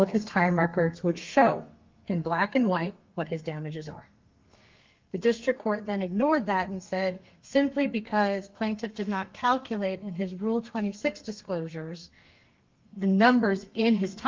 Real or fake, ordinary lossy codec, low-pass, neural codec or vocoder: fake; Opus, 24 kbps; 7.2 kHz; codec, 32 kHz, 1.9 kbps, SNAC